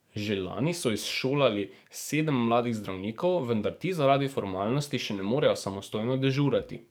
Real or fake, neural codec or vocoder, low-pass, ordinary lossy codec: fake; codec, 44.1 kHz, 7.8 kbps, DAC; none; none